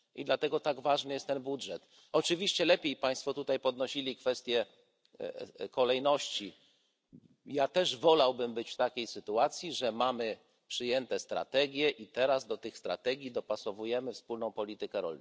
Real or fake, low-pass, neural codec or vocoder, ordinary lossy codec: real; none; none; none